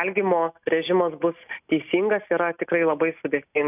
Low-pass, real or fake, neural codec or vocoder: 3.6 kHz; real; none